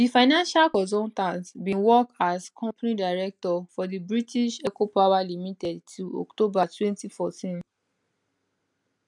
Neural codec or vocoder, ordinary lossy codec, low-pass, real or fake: none; none; 10.8 kHz; real